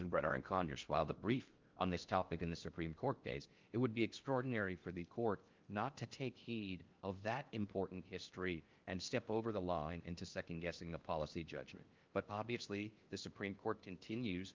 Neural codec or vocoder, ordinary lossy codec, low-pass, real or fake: codec, 16 kHz in and 24 kHz out, 0.6 kbps, FocalCodec, streaming, 4096 codes; Opus, 24 kbps; 7.2 kHz; fake